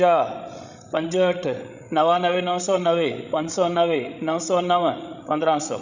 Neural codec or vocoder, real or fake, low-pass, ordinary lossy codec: codec, 16 kHz, 16 kbps, FreqCodec, larger model; fake; 7.2 kHz; none